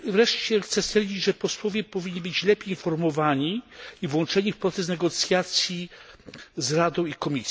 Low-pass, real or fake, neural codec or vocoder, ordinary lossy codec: none; real; none; none